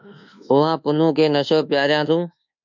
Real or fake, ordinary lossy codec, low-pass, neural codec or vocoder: fake; MP3, 64 kbps; 7.2 kHz; codec, 24 kHz, 1.2 kbps, DualCodec